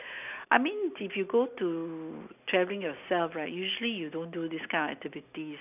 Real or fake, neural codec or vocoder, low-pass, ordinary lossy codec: real; none; 3.6 kHz; none